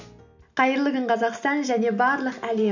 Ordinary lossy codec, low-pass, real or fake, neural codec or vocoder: none; 7.2 kHz; real; none